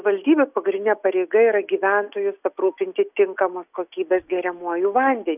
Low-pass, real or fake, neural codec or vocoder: 3.6 kHz; real; none